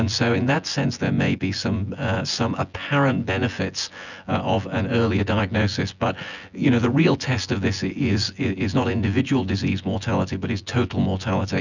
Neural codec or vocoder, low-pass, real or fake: vocoder, 24 kHz, 100 mel bands, Vocos; 7.2 kHz; fake